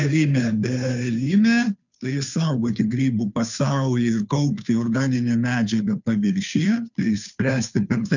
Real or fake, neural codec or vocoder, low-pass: fake; codec, 16 kHz, 2 kbps, FunCodec, trained on Chinese and English, 25 frames a second; 7.2 kHz